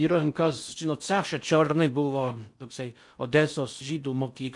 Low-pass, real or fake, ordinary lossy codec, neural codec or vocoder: 10.8 kHz; fake; MP3, 64 kbps; codec, 16 kHz in and 24 kHz out, 0.6 kbps, FocalCodec, streaming, 4096 codes